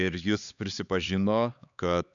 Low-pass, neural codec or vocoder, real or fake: 7.2 kHz; codec, 16 kHz, 4 kbps, X-Codec, HuBERT features, trained on LibriSpeech; fake